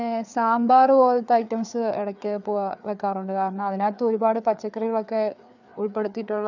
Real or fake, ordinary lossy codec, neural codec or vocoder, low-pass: fake; none; codec, 16 kHz, 4 kbps, FreqCodec, larger model; 7.2 kHz